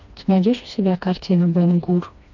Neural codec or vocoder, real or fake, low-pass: codec, 16 kHz, 2 kbps, FreqCodec, smaller model; fake; 7.2 kHz